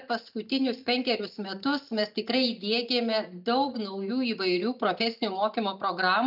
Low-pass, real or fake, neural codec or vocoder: 5.4 kHz; real; none